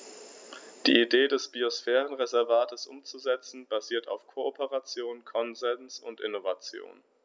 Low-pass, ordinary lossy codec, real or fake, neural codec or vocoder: none; none; real; none